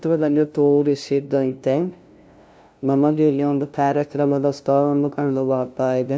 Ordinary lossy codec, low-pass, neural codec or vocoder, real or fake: none; none; codec, 16 kHz, 0.5 kbps, FunCodec, trained on LibriTTS, 25 frames a second; fake